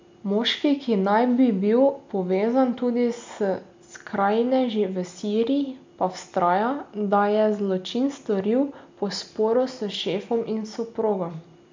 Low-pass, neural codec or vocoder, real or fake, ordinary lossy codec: 7.2 kHz; none; real; MP3, 64 kbps